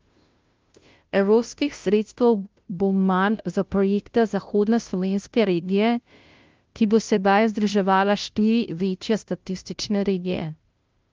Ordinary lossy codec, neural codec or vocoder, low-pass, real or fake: Opus, 24 kbps; codec, 16 kHz, 0.5 kbps, FunCodec, trained on Chinese and English, 25 frames a second; 7.2 kHz; fake